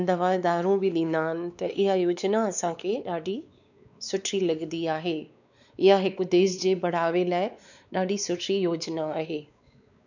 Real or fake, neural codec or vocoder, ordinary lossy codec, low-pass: fake; codec, 16 kHz, 4 kbps, X-Codec, WavLM features, trained on Multilingual LibriSpeech; none; 7.2 kHz